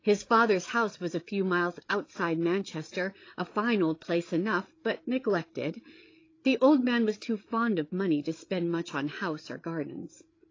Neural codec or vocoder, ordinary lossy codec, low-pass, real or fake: codec, 16 kHz, 8 kbps, FreqCodec, larger model; AAC, 32 kbps; 7.2 kHz; fake